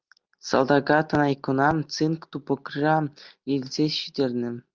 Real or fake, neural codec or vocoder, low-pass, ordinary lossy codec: real; none; 7.2 kHz; Opus, 24 kbps